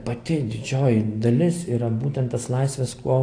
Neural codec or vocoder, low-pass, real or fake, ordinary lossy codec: none; 9.9 kHz; real; AAC, 48 kbps